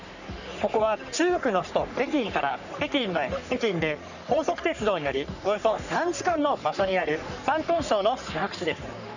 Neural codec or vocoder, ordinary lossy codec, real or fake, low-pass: codec, 44.1 kHz, 3.4 kbps, Pupu-Codec; none; fake; 7.2 kHz